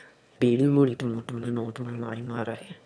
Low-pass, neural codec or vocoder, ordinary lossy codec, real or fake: none; autoencoder, 22.05 kHz, a latent of 192 numbers a frame, VITS, trained on one speaker; none; fake